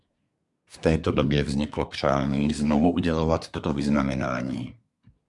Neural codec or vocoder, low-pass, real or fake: codec, 24 kHz, 1 kbps, SNAC; 10.8 kHz; fake